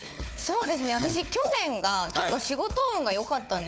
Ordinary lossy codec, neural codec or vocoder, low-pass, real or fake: none; codec, 16 kHz, 4 kbps, FunCodec, trained on Chinese and English, 50 frames a second; none; fake